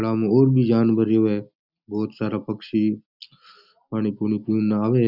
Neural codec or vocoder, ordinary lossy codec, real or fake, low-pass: none; none; real; 5.4 kHz